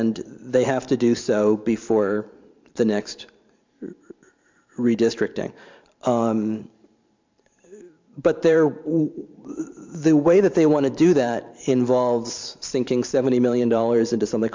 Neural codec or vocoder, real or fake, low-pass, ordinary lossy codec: vocoder, 44.1 kHz, 128 mel bands every 512 samples, BigVGAN v2; fake; 7.2 kHz; MP3, 64 kbps